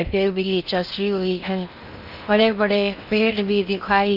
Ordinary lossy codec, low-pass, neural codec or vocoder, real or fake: none; 5.4 kHz; codec, 16 kHz in and 24 kHz out, 0.6 kbps, FocalCodec, streaming, 4096 codes; fake